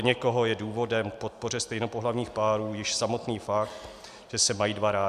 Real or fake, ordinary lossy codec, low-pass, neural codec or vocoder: real; AAC, 96 kbps; 14.4 kHz; none